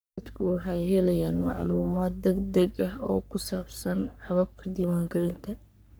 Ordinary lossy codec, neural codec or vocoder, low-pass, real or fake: none; codec, 44.1 kHz, 3.4 kbps, Pupu-Codec; none; fake